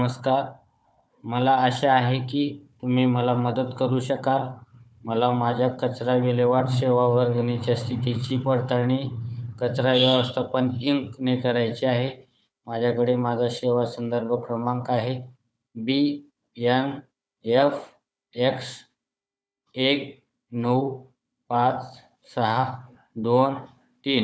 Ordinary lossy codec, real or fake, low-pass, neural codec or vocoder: none; fake; none; codec, 16 kHz, 4 kbps, FunCodec, trained on Chinese and English, 50 frames a second